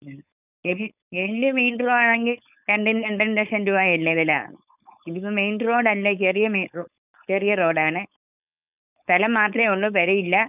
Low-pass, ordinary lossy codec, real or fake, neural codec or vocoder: 3.6 kHz; none; fake; codec, 16 kHz, 4.8 kbps, FACodec